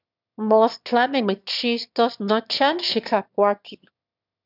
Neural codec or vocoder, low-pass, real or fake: autoencoder, 22.05 kHz, a latent of 192 numbers a frame, VITS, trained on one speaker; 5.4 kHz; fake